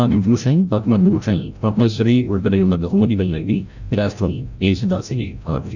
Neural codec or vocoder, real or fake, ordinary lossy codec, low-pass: codec, 16 kHz, 0.5 kbps, FreqCodec, larger model; fake; none; 7.2 kHz